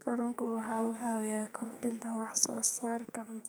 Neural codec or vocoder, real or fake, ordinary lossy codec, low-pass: codec, 44.1 kHz, 2.6 kbps, SNAC; fake; none; none